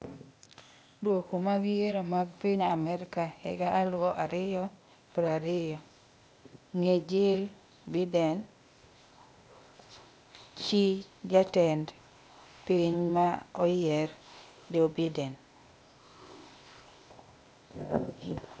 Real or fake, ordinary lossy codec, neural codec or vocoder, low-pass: fake; none; codec, 16 kHz, 0.8 kbps, ZipCodec; none